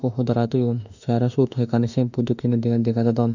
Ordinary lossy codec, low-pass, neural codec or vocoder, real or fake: none; 7.2 kHz; autoencoder, 48 kHz, 32 numbers a frame, DAC-VAE, trained on Japanese speech; fake